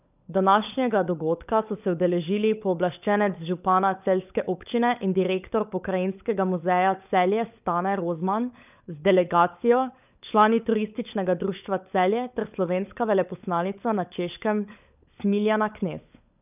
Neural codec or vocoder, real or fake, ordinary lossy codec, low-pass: codec, 16 kHz, 16 kbps, FunCodec, trained on LibriTTS, 50 frames a second; fake; none; 3.6 kHz